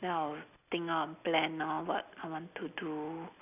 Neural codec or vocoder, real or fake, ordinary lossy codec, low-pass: none; real; none; 3.6 kHz